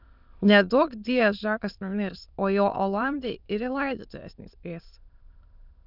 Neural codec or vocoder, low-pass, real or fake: autoencoder, 22.05 kHz, a latent of 192 numbers a frame, VITS, trained on many speakers; 5.4 kHz; fake